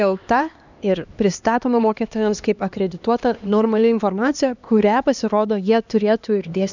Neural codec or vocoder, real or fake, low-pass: codec, 16 kHz, 2 kbps, X-Codec, HuBERT features, trained on LibriSpeech; fake; 7.2 kHz